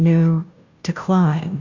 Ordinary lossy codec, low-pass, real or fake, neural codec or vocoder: Opus, 64 kbps; 7.2 kHz; fake; codec, 16 kHz, 0.5 kbps, FunCodec, trained on Chinese and English, 25 frames a second